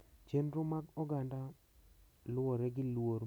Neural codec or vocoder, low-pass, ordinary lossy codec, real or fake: none; none; none; real